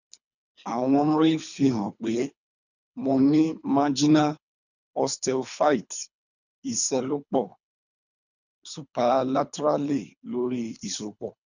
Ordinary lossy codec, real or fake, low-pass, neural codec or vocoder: none; fake; 7.2 kHz; codec, 24 kHz, 3 kbps, HILCodec